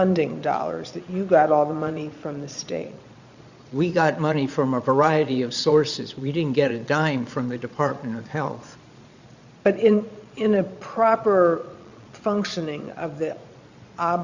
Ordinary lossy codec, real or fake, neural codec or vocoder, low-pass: Opus, 64 kbps; fake; vocoder, 22.05 kHz, 80 mel bands, Vocos; 7.2 kHz